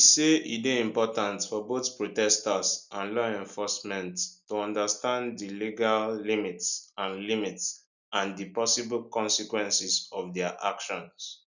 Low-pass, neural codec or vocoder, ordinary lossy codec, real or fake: 7.2 kHz; none; none; real